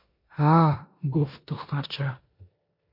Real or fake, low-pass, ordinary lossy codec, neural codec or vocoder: fake; 5.4 kHz; MP3, 32 kbps; codec, 16 kHz in and 24 kHz out, 0.9 kbps, LongCat-Audio-Codec, four codebook decoder